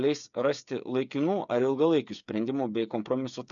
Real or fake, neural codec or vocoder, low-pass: fake; codec, 16 kHz, 8 kbps, FreqCodec, smaller model; 7.2 kHz